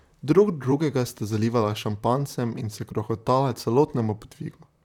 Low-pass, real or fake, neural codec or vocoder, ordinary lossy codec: 19.8 kHz; fake; vocoder, 44.1 kHz, 128 mel bands, Pupu-Vocoder; none